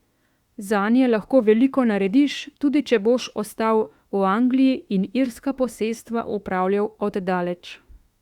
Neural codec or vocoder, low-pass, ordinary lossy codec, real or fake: autoencoder, 48 kHz, 32 numbers a frame, DAC-VAE, trained on Japanese speech; 19.8 kHz; Opus, 64 kbps; fake